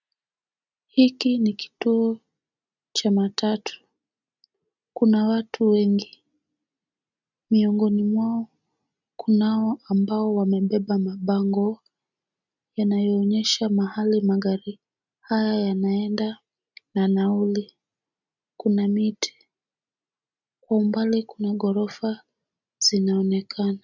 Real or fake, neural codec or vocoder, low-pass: real; none; 7.2 kHz